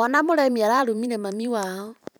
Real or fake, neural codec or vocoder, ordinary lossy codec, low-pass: fake; vocoder, 44.1 kHz, 128 mel bands every 512 samples, BigVGAN v2; none; none